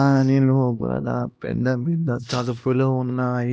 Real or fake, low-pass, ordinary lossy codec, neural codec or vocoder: fake; none; none; codec, 16 kHz, 1 kbps, X-Codec, HuBERT features, trained on LibriSpeech